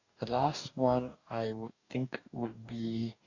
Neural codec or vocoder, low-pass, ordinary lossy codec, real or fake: codec, 44.1 kHz, 2.6 kbps, DAC; 7.2 kHz; none; fake